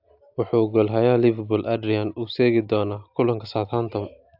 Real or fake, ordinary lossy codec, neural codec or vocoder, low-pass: real; AAC, 48 kbps; none; 5.4 kHz